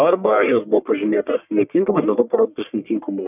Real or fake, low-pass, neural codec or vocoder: fake; 3.6 kHz; codec, 44.1 kHz, 1.7 kbps, Pupu-Codec